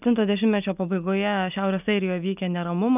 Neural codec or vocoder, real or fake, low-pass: none; real; 3.6 kHz